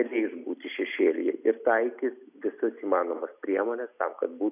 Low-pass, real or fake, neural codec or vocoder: 3.6 kHz; real; none